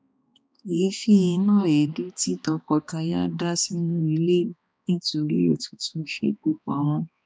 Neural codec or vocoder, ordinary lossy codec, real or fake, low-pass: codec, 16 kHz, 2 kbps, X-Codec, HuBERT features, trained on balanced general audio; none; fake; none